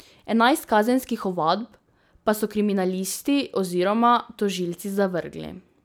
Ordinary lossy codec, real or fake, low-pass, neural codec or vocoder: none; real; none; none